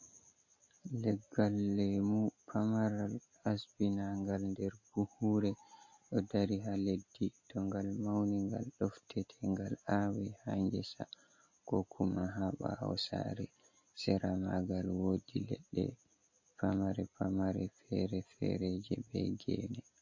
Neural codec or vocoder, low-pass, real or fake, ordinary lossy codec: none; 7.2 kHz; real; MP3, 32 kbps